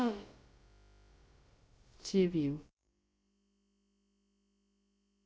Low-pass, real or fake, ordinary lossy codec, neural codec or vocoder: none; fake; none; codec, 16 kHz, about 1 kbps, DyCAST, with the encoder's durations